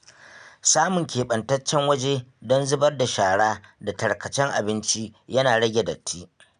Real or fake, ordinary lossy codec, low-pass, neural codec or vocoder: real; none; 9.9 kHz; none